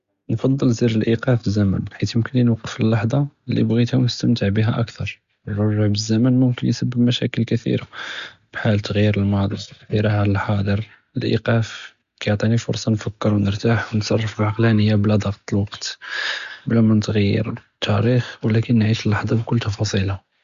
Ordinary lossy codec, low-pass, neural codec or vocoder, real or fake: Opus, 64 kbps; 7.2 kHz; none; real